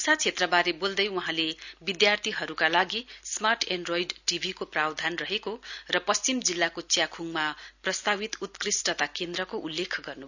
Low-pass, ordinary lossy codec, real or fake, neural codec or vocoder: 7.2 kHz; none; real; none